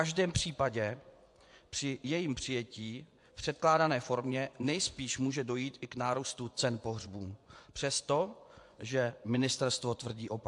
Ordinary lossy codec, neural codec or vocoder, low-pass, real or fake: AAC, 64 kbps; vocoder, 24 kHz, 100 mel bands, Vocos; 10.8 kHz; fake